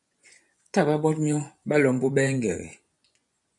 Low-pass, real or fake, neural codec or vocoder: 10.8 kHz; fake; vocoder, 24 kHz, 100 mel bands, Vocos